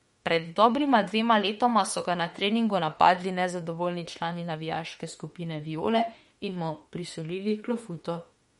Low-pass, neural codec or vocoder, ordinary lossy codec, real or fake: 19.8 kHz; autoencoder, 48 kHz, 32 numbers a frame, DAC-VAE, trained on Japanese speech; MP3, 48 kbps; fake